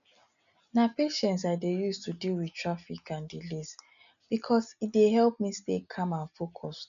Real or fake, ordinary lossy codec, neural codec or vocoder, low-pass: real; none; none; 7.2 kHz